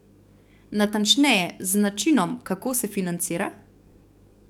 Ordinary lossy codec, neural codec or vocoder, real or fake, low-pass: none; codec, 44.1 kHz, 7.8 kbps, DAC; fake; 19.8 kHz